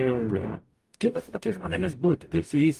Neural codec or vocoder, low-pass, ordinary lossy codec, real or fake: codec, 44.1 kHz, 0.9 kbps, DAC; 14.4 kHz; Opus, 32 kbps; fake